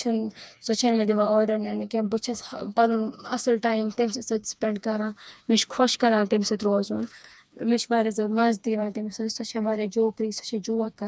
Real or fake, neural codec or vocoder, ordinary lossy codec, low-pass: fake; codec, 16 kHz, 2 kbps, FreqCodec, smaller model; none; none